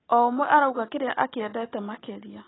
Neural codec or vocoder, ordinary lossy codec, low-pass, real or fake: none; AAC, 16 kbps; 7.2 kHz; real